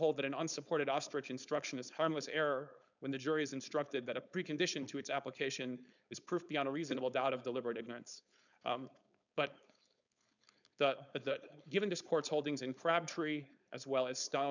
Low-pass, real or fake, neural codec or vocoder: 7.2 kHz; fake; codec, 16 kHz, 4.8 kbps, FACodec